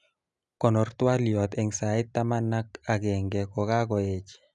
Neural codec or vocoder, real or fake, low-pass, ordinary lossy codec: none; real; 10.8 kHz; none